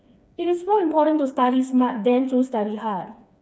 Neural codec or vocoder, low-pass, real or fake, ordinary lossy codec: codec, 16 kHz, 4 kbps, FreqCodec, smaller model; none; fake; none